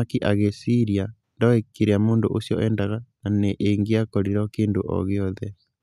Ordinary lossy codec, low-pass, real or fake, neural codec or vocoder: none; 14.4 kHz; real; none